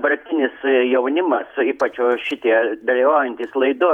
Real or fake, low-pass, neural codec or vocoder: fake; 19.8 kHz; vocoder, 48 kHz, 128 mel bands, Vocos